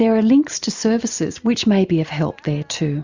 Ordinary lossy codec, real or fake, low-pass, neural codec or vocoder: Opus, 64 kbps; real; 7.2 kHz; none